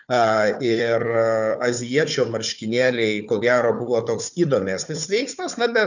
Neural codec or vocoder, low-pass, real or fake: codec, 16 kHz, 4 kbps, FunCodec, trained on Chinese and English, 50 frames a second; 7.2 kHz; fake